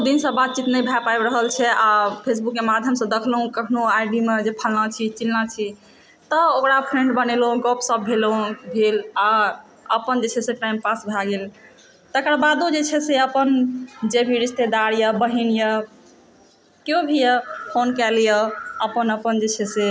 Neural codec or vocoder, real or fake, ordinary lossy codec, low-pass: none; real; none; none